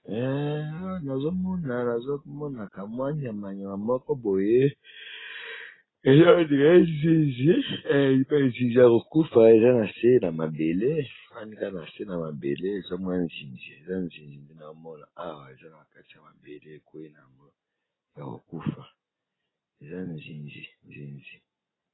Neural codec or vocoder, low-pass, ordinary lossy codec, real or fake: none; 7.2 kHz; AAC, 16 kbps; real